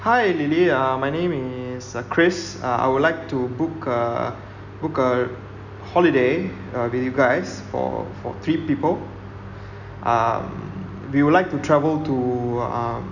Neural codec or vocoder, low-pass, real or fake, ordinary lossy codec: none; 7.2 kHz; real; none